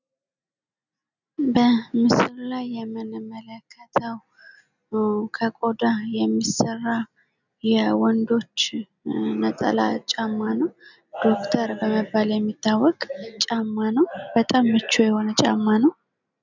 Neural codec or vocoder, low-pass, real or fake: none; 7.2 kHz; real